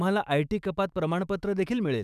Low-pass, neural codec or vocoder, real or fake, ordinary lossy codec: 14.4 kHz; autoencoder, 48 kHz, 128 numbers a frame, DAC-VAE, trained on Japanese speech; fake; none